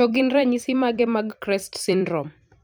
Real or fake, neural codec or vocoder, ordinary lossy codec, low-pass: fake; vocoder, 44.1 kHz, 128 mel bands every 256 samples, BigVGAN v2; none; none